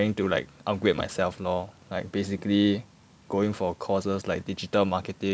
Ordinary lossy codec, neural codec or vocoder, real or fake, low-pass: none; none; real; none